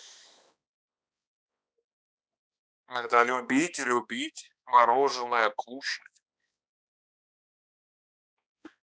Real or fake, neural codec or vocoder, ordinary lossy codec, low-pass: fake; codec, 16 kHz, 2 kbps, X-Codec, HuBERT features, trained on balanced general audio; none; none